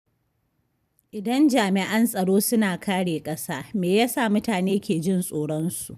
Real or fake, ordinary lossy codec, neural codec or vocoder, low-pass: fake; none; vocoder, 44.1 kHz, 128 mel bands every 256 samples, BigVGAN v2; 14.4 kHz